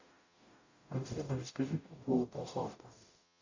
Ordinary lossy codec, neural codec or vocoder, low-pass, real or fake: AAC, 48 kbps; codec, 44.1 kHz, 0.9 kbps, DAC; 7.2 kHz; fake